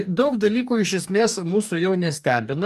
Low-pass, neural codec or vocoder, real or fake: 14.4 kHz; codec, 44.1 kHz, 2.6 kbps, DAC; fake